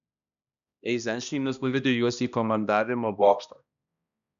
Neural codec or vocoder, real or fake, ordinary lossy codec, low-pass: codec, 16 kHz, 1 kbps, X-Codec, HuBERT features, trained on balanced general audio; fake; MP3, 96 kbps; 7.2 kHz